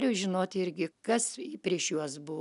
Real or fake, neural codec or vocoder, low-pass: real; none; 10.8 kHz